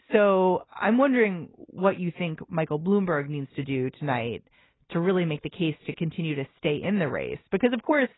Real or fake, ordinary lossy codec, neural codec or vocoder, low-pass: real; AAC, 16 kbps; none; 7.2 kHz